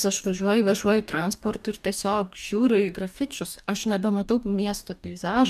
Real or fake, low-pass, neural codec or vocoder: fake; 14.4 kHz; codec, 44.1 kHz, 2.6 kbps, DAC